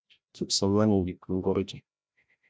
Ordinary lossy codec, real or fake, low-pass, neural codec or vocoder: none; fake; none; codec, 16 kHz, 0.5 kbps, FreqCodec, larger model